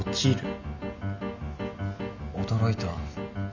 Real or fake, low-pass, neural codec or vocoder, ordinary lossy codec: real; 7.2 kHz; none; none